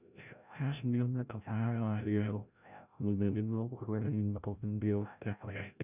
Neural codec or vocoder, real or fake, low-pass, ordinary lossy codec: codec, 16 kHz, 0.5 kbps, FreqCodec, larger model; fake; 3.6 kHz; none